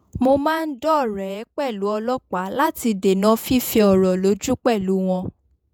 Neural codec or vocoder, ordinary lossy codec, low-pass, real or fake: vocoder, 48 kHz, 128 mel bands, Vocos; none; none; fake